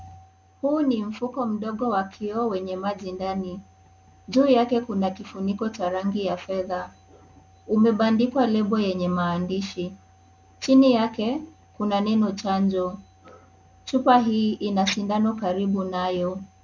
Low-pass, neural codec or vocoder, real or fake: 7.2 kHz; none; real